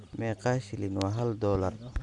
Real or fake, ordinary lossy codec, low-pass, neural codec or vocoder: real; none; 10.8 kHz; none